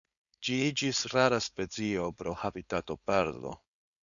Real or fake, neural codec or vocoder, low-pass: fake; codec, 16 kHz, 4.8 kbps, FACodec; 7.2 kHz